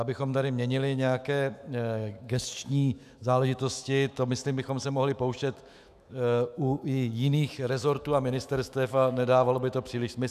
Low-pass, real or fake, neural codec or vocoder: 14.4 kHz; fake; autoencoder, 48 kHz, 128 numbers a frame, DAC-VAE, trained on Japanese speech